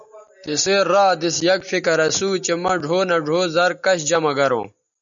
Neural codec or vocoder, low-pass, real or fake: none; 7.2 kHz; real